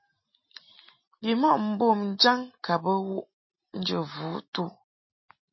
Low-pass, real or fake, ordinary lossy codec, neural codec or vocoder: 7.2 kHz; real; MP3, 24 kbps; none